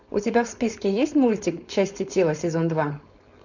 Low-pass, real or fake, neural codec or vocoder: 7.2 kHz; fake; codec, 16 kHz, 4.8 kbps, FACodec